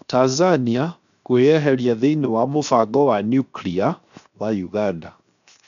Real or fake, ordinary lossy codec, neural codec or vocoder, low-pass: fake; none; codec, 16 kHz, 0.7 kbps, FocalCodec; 7.2 kHz